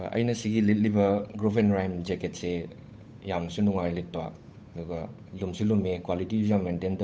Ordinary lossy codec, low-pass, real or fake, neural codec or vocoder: none; none; fake; codec, 16 kHz, 8 kbps, FunCodec, trained on Chinese and English, 25 frames a second